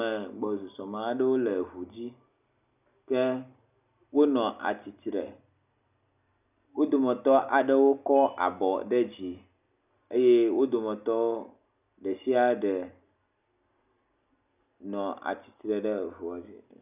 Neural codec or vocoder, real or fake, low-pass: none; real; 3.6 kHz